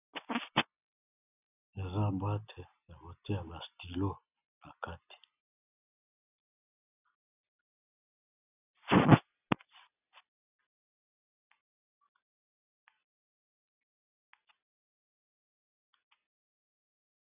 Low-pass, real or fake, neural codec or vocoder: 3.6 kHz; real; none